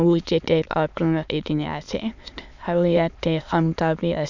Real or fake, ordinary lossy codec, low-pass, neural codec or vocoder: fake; none; 7.2 kHz; autoencoder, 22.05 kHz, a latent of 192 numbers a frame, VITS, trained on many speakers